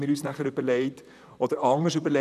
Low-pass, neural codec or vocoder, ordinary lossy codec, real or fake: 14.4 kHz; vocoder, 44.1 kHz, 128 mel bands, Pupu-Vocoder; none; fake